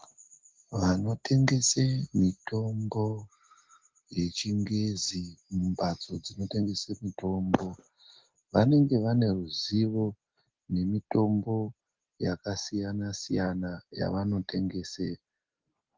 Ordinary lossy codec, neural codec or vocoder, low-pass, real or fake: Opus, 16 kbps; none; 7.2 kHz; real